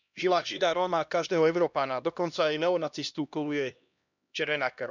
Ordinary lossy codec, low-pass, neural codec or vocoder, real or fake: none; 7.2 kHz; codec, 16 kHz, 1 kbps, X-Codec, HuBERT features, trained on LibriSpeech; fake